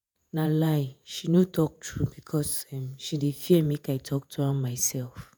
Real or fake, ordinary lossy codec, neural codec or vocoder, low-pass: fake; none; vocoder, 48 kHz, 128 mel bands, Vocos; none